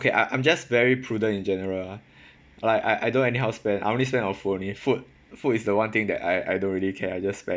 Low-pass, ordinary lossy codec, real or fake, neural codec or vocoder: none; none; real; none